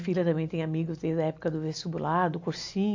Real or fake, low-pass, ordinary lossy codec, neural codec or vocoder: real; 7.2 kHz; AAC, 48 kbps; none